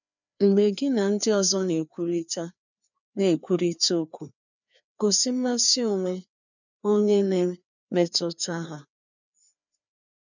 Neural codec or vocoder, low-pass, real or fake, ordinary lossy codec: codec, 16 kHz, 2 kbps, FreqCodec, larger model; 7.2 kHz; fake; none